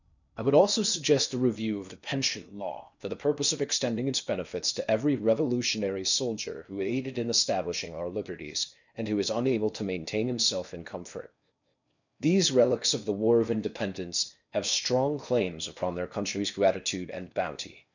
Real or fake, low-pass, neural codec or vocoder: fake; 7.2 kHz; codec, 16 kHz in and 24 kHz out, 0.8 kbps, FocalCodec, streaming, 65536 codes